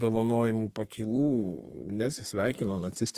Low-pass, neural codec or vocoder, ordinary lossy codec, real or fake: 14.4 kHz; codec, 32 kHz, 1.9 kbps, SNAC; Opus, 24 kbps; fake